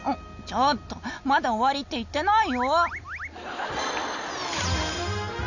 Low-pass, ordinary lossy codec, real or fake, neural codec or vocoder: 7.2 kHz; none; real; none